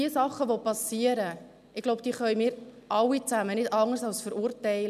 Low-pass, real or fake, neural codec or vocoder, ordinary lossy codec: 14.4 kHz; real; none; none